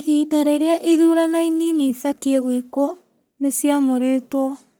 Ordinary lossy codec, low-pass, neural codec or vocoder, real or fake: none; none; codec, 44.1 kHz, 1.7 kbps, Pupu-Codec; fake